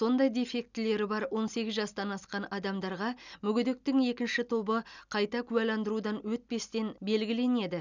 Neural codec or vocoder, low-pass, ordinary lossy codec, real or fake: none; 7.2 kHz; none; real